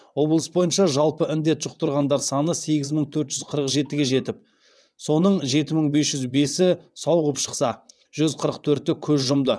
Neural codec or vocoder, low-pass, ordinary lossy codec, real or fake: vocoder, 22.05 kHz, 80 mel bands, WaveNeXt; none; none; fake